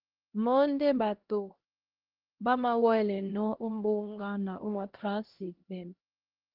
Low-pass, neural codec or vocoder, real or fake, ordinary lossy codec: 5.4 kHz; codec, 16 kHz, 1 kbps, X-Codec, HuBERT features, trained on LibriSpeech; fake; Opus, 16 kbps